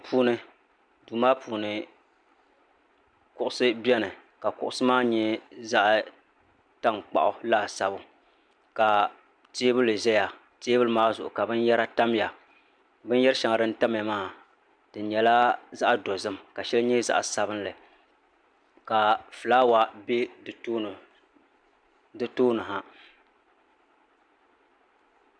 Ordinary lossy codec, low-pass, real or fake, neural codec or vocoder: MP3, 96 kbps; 9.9 kHz; real; none